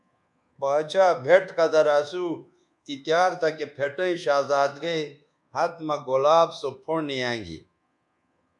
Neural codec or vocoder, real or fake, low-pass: codec, 24 kHz, 1.2 kbps, DualCodec; fake; 10.8 kHz